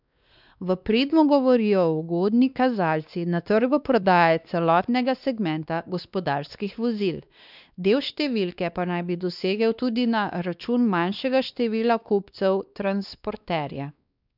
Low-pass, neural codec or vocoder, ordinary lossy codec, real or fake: 5.4 kHz; codec, 16 kHz, 2 kbps, X-Codec, WavLM features, trained on Multilingual LibriSpeech; none; fake